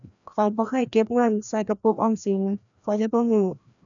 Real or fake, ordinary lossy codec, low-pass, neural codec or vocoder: fake; none; 7.2 kHz; codec, 16 kHz, 1 kbps, FreqCodec, larger model